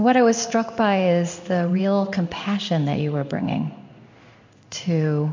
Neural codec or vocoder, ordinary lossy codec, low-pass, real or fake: codec, 16 kHz in and 24 kHz out, 1 kbps, XY-Tokenizer; MP3, 64 kbps; 7.2 kHz; fake